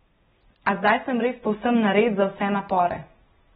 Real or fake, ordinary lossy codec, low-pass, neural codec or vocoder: real; AAC, 16 kbps; 14.4 kHz; none